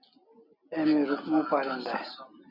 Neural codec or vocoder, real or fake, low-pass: none; real; 5.4 kHz